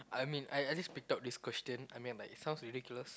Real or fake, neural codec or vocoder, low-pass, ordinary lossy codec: real; none; none; none